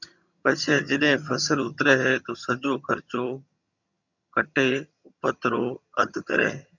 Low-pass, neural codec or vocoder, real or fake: 7.2 kHz; vocoder, 22.05 kHz, 80 mel bands, HiFi-GAN; fake